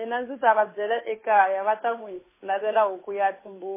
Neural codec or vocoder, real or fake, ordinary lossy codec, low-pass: codec, 16 kHz, 8 kbps, FunCodec, trained on Chinese and English, 25 frames a second; fake; MP3, 16 kbps; 3.6 kHz